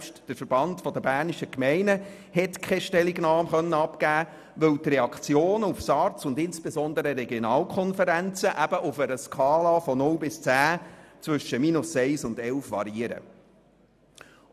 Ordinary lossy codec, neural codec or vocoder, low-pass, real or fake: none; none; 14.4 kHz; real